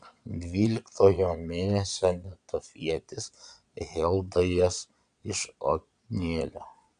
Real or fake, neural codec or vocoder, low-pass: fake; vocoder, 22.05 kHz, 80 mel bands, Vocos; 9.9 kHz